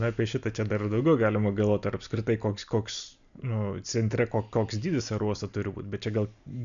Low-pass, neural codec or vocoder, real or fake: 7.2 kHz; none; real